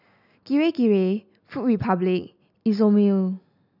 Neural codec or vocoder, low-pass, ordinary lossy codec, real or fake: none; 5.4 kHz; none; real